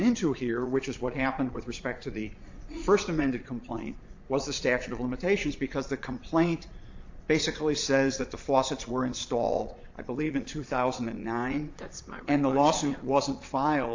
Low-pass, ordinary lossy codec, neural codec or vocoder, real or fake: 7.2 kHz; AAC, 48 kbps; vocoder, 22.05 kHz, 80 mel bands, Vocos; fake